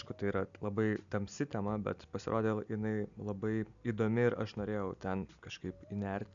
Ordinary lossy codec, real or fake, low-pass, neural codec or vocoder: MP3, 96 kbps; real; 7.2 kHz; none